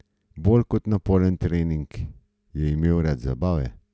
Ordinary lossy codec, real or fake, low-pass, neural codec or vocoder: none; real; none; none